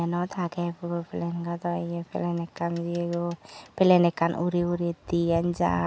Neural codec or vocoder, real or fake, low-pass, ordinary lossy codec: none; real; none; none